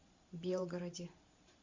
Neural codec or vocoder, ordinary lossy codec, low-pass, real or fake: vocoder, 44.1 kHz, 128 mel bands every 512 samples, BigVGAN v2; MP3, 64 kbps; 7.2 kHz; fake